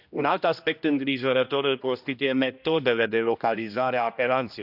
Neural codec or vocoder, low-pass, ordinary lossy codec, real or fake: codec, 16 kHz, 1 kbps, X-Codec, HuBERT features, trained on general audio; 5.4 kHz; none; fake